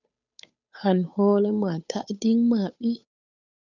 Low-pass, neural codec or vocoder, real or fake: 7.2 kHz; codec, 16 kHz, 8 kbps, FunCodec, trained on Chinese and English, 25 frames a second; fake